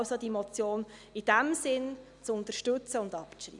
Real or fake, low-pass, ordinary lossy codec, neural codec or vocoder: real; 10.8 kHz; none; none